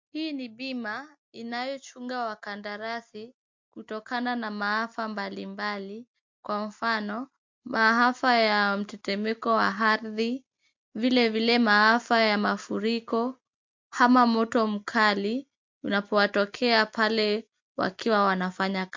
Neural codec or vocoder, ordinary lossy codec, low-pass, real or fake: none; MP3, 48 kbps; 7.2 kHz; real